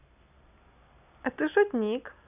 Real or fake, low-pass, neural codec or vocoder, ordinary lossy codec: real; 3.6 kHz; none; AAC, 32 kbps